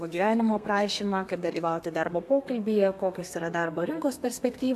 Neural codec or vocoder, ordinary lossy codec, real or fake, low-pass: codec, 32 kHz, 1.9 kbps, SNAC; AAC, 96 kbps; fake; 14.4 kHz